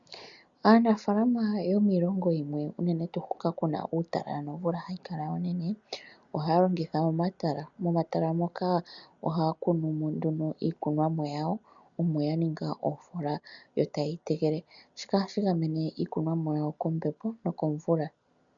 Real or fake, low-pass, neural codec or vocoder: real; 7.2 kHz; none